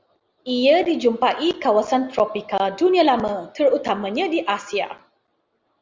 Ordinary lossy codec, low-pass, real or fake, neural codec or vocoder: Opus, 32 kbps; 7.2 kHz; real; none